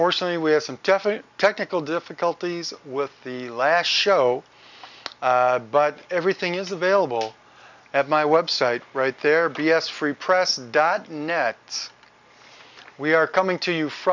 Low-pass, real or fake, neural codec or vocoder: 7.2 kHz; real; none